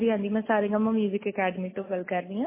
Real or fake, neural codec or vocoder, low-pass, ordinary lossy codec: real; none; 3.6 kHz; MP3, 16 kbps